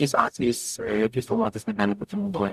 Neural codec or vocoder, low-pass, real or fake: codec, 44.1 kHz, 0.9 kbps, DAC; 14.4 kHz; fake